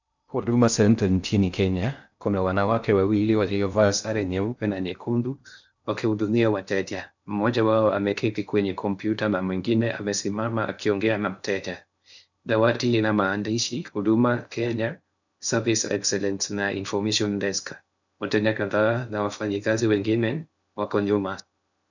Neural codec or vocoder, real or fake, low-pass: codec, 16 kHz in and 24 kHz out, 0.6 kbps, FocalCodec, streaming, 2048 codes; fake; 7.2 kHz